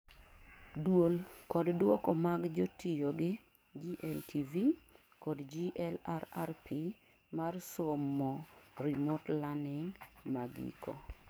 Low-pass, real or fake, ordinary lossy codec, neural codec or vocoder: none; fake; none; codec, 44.1 kHz, 7.8 kbps, DAC